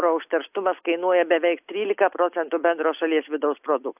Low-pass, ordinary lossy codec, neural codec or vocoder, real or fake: 3.6 kHz; AAC, 32 kbps; none; real